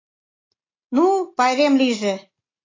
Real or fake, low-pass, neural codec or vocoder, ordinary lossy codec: real; 7.2 kHz; none; AAC, 32 kbps